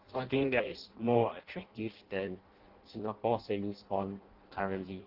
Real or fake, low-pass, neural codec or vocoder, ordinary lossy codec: fake; 5.4 kHz; codec, 16 kHz in and 24 kHz out, 0.6 kbps, FireRedTTS-2 codec; Opus, 16 kbps